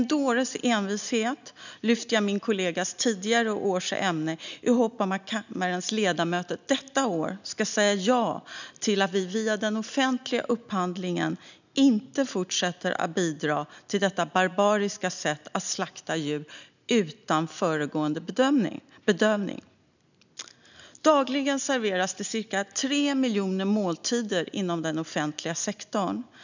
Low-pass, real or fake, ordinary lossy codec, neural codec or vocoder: 7.2 kHz; real; none; none